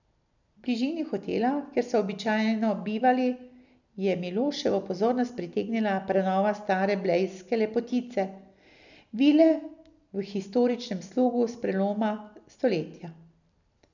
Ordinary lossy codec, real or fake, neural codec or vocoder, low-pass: none; real; none; 7.2 kHz